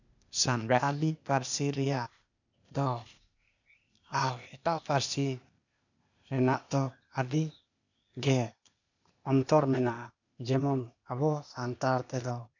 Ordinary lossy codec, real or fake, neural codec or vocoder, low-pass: none; fake; codec, 16 kHz, 0.8 kbps, ZipCodec; 7.2 kHz